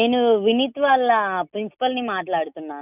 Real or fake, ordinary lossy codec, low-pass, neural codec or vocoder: real; none; 3.6 kHz; none